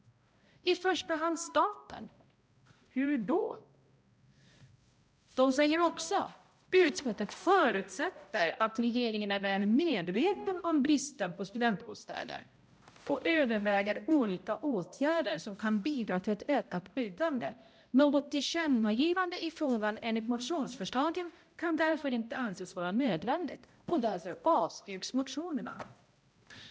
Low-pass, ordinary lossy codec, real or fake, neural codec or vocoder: none; none; fake; codec, 16 kHz, 0.5 kbps, X-Codec, HuBERT features, trained on balanced general audio